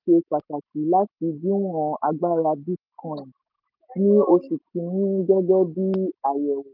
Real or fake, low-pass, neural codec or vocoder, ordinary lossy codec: real; 5.4 kHz; none; none